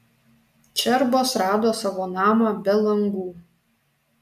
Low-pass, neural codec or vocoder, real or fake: 14.4 kHz; none; real